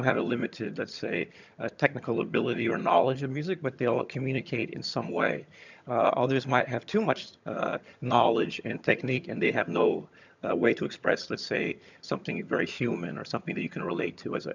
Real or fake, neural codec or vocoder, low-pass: fake; vocoder, 22.05 kHz, 80 mel bands, HiFi-GAN; 7.2 kHz